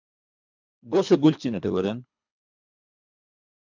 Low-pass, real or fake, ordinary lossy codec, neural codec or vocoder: 7.2 kHz; fake; MP3, 64 kbps; codec, 24 kHz, 3 kbps, HILCodec